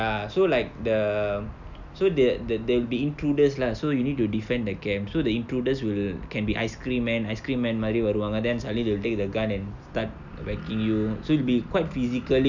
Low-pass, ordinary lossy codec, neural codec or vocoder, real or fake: 7.2 kHz; none; none; real